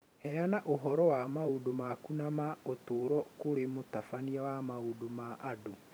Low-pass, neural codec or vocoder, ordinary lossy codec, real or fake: none; vocoder, 44.1 kHz, 128 mel bands every 512 samples, BigVGAN v2; none; fake